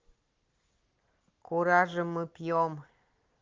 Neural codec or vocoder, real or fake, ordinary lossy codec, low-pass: none; real; Opus, 16 kbps; 7.2 kHz